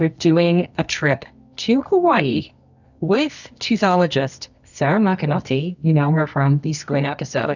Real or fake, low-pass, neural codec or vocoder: fake; 7.2 kHz; codec, 24 kHz, 0.9 kbps, WavTokenizer, medium music audio release